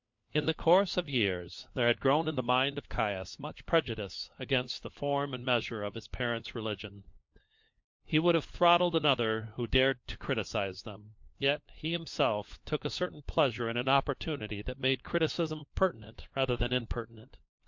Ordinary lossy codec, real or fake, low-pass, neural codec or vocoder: MP3, 48 kbps; fake; 7.2 kHz; codec, 16 kHz, 4 kbps, FunCodec, trained on LibriTTS, 50 frames a second